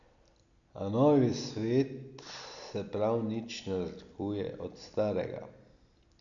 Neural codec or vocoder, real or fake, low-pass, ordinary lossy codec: none; real; 7.2 kHz; none